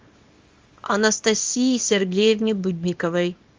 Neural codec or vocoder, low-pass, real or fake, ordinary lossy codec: codec, 24 kHz, 0.9 kbps, WavTokenizer, small release; 7.2 kHz; fake; Opus, 32 kbps